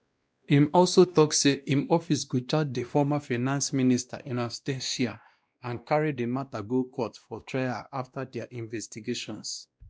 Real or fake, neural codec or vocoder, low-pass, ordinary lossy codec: fake; codec, 16 kHz, 1 kbps, X-Codec, WavLM features, trained on Multilingual LibriSpeech; none; none